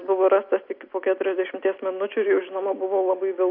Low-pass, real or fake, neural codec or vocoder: 5.4 kHz; real; none